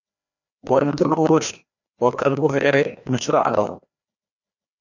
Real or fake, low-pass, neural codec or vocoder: fake; 7.2 kHz; codec, 16 kHz, 1 kbps, FreqCodec, larger model